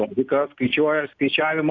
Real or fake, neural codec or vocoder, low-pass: real; none; 7.2 kHz